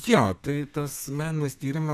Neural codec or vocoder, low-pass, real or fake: codec, 32 kHz, 1.9 kbps, SNAC; 14.4 kHz; fake